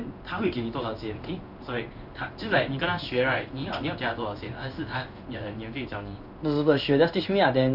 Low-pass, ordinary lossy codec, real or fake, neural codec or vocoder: 5.4 kHz; none; fake; codec, 16 kHz in and 24 kHz out, 1 kbps, XY-Tokenizer